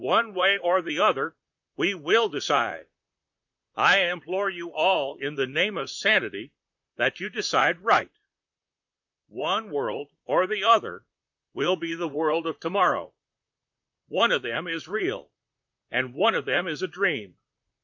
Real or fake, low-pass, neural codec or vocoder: fake; 7.2 kHz; vocoder, 44.1 kHz, 128 mel bands, Pupu-Vocoder